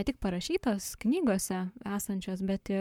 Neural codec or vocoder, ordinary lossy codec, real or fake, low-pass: codec, 44.1 kHz, 7.8 kbps, Pupu-Codec; MP3, 96 kbps; fake; 19.8 kHz